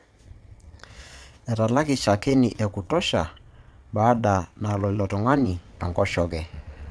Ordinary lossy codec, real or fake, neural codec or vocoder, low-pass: none; fake; vocoder, 22.05 kHz, 80 mel bands, Vocos; none